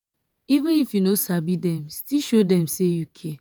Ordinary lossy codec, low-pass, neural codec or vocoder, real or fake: none; none; vocoder, 48 kHz, 128 mel bands, Vocos; fake